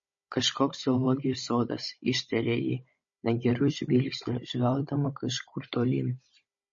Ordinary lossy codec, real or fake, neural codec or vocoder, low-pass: MP3, 32 kbps; fake; codec, 16 kHz, 16 kbps, FunCodec, trained on Chinese and English, 50 frames a second; 7.2 kHz